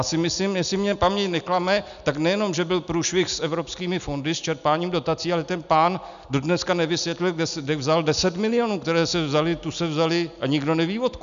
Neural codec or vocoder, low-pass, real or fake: none; 7.2 kHz; real